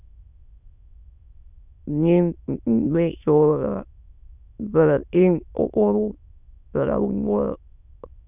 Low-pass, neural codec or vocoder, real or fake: 3.6 kHz; autoencoder, 22.05 kHz, a latent of 192 numbers a frame, VITS, trained on many speakers; fake